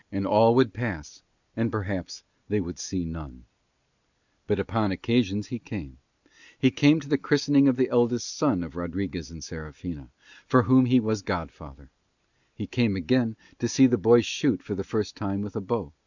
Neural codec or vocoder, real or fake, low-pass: none; real; 7.2 kHz